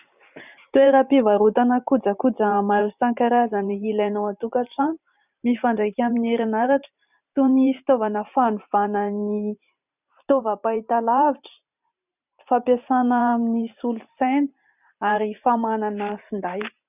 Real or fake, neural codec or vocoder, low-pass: fake; vocoder, 44.1 kHz, 128 mel bands every 512 samples, BigVGAN v2; 3.6 kHz